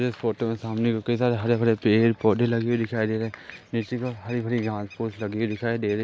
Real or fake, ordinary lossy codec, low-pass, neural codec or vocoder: real; none; none; none